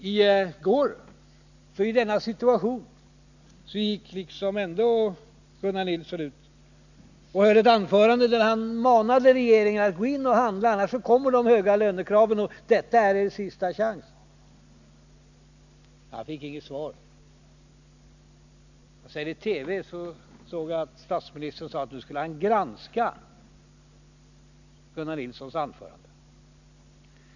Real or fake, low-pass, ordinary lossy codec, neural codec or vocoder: real; 7.2 kHz; none; none